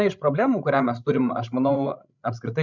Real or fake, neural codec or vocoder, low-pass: fake; codec, 16 kHz, 16 kbps, FreqCodec, larger model; 7.2 kHz